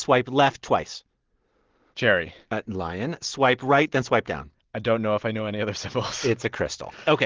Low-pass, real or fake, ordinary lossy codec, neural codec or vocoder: 7.2 kHz; real; Opus, 16 kbps; none